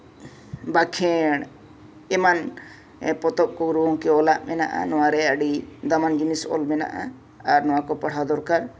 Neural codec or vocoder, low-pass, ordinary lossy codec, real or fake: none; none; none; real